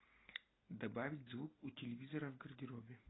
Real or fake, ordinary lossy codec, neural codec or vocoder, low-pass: real; AAC, 16 kbps; none; 7.2 kHz